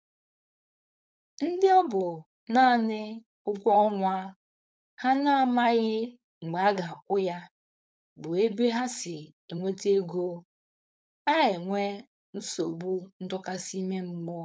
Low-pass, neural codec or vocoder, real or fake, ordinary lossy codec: none; codec, 16 kHz, 4.8 kbps, FACodec; fake; none